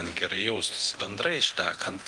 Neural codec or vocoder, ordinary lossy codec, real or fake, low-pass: codec, 24 kHz, 0.9 kbps, DualCodec; Opus, 24 kbps; fake; 10.8 kHz